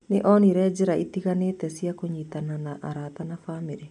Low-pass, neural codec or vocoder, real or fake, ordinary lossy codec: 10.8 kHz; none; real; none